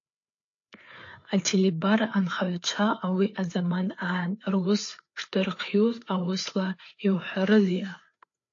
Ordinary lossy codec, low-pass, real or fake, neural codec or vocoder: AAC, 48 kbps; 7.2 kHz; fake; codec, 16 kHz, 4 kbps, FreqCodec, larger model